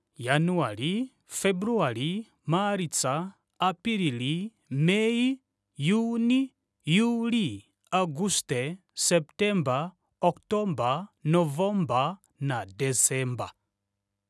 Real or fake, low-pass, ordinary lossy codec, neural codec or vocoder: real; none; none; none